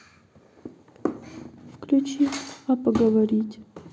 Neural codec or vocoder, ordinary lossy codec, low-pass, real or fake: none; none; none; real